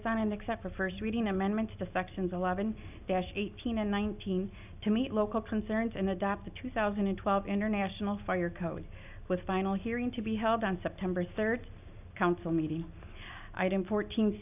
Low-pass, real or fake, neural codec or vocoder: 3.6 kHz; real; none